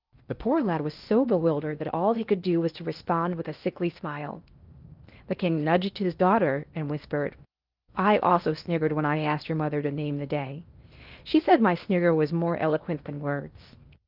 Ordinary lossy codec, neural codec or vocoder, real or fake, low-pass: Opus, 32 kbps; codec, 16 kHz in and 24 kHz out, 0.8 kbps, FocalCodec, streaming, 65536 codes; fake; 5.4 kHz